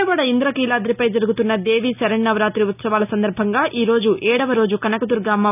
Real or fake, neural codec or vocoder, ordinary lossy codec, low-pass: real; none; none; 3.6 kHz